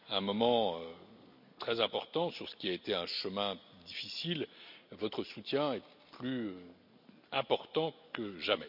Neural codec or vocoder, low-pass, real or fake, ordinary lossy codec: none; 5.4 kHz; real; none